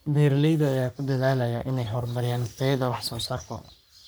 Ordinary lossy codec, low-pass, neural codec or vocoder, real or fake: none; none; codec, 44.1 kHz, 3.4 kbps, Pupu-Codec; fake